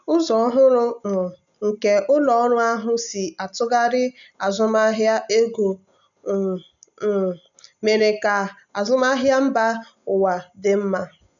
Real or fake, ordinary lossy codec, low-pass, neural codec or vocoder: real; none; 7.2 kHz; none